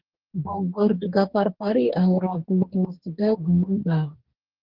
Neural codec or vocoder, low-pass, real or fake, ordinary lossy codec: codec, 44.1 kHz, 2.6 kbps, DAC; 5.4 kHz; fake; Opus, 16 kbps